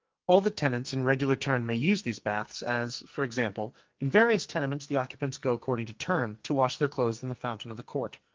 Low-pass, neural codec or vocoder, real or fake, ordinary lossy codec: 7.2 kHz; codec, 44.1 kHz, 2.6 kbps, SNAC; fake; Opus, 32 kbps